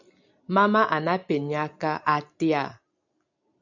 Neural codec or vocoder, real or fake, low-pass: none; real; 7.2 kHz